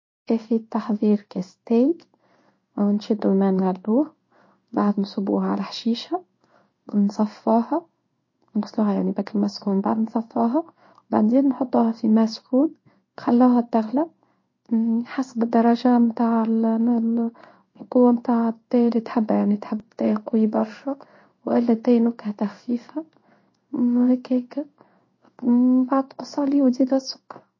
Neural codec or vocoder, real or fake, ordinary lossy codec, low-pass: codec, 16 kHz in and 24 kHz out, 1 kbps, XY-Tokenizer; fake; MP3, 32 kbps; 7.2 kHz